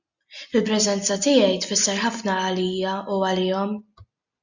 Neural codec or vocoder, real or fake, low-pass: none; real; 7.2 kHz